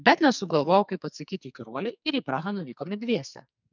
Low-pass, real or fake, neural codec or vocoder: 7.2 kHz; fake; codec, 44.1 kHz, 2.6 kbps, SNAC